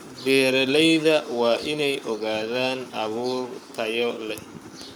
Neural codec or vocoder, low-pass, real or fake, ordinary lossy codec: codec, 44.1 kHz, 7.8 kbps, Pupu-Codec; 19.8 kHz; fake; none